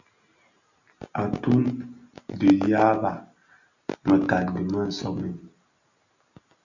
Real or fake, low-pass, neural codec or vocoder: real; 7.2 kHz; none